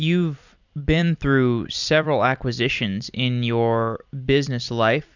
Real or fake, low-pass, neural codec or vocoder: fake; 7.2 kHz; vocoder, 44.1 kHz, 128 mel bands every 256 samples, BigVGAN v2